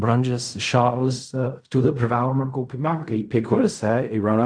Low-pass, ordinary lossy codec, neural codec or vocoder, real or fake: 9.9 kHz; MP3, 96 kbps; codec, 16 kHz in and 24 kHz out, 0.4 kbps, LongCat-Audio-Codec, fine tuned four codebook decoder; fake